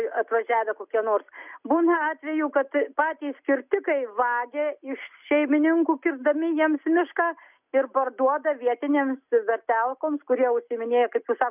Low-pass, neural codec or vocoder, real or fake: 3.6 kHz; none; real